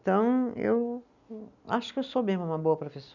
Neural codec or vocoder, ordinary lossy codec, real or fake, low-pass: none; none; real; 7.2 kHz